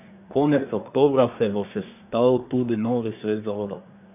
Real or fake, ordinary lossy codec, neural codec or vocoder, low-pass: fake; none; codec, 24 kHz, 1 kbps, SNAC; 3.6 kHz